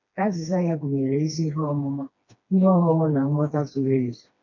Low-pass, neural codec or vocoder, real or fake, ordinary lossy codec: 7.2 kHz; codec, 16 kHz, 2 kbps, FreqCodec, smaller model; fake; none